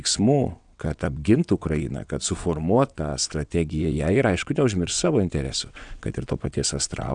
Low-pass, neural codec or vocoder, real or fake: 9.9 kHz; vocoder, 22.05 kHz, 80 mel bands, WaveNeXt; fake